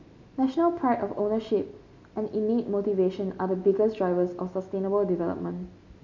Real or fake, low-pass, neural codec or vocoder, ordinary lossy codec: real; 7.2 kHz; none; MP3, 48 kbps